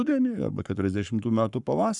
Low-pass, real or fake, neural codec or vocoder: 10.8 kHz; fake; codec, 44.1 kHz, 7.8 kbps, Pupu-Codec